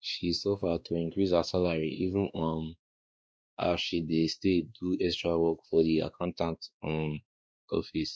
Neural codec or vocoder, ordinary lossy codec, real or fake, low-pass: codec, 16 kHz, 2 kbps, X-Codec, WavLM features, trained on Multilingual LibriSpeech; none; fake; none